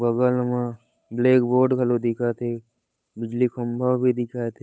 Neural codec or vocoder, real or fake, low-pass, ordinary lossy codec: codec, 16 kHz, 8 kbps, FunCodec, trained on Chinese and English, 25 frames a second; fake; none; none